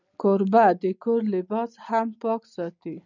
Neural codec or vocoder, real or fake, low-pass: none; real; 7.2 kHz